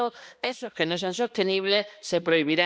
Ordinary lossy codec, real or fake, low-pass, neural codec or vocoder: none; fake; none; codec, 16 kHz, 1 kbps, X-Codec, HuBERT features, trained on balanced general audio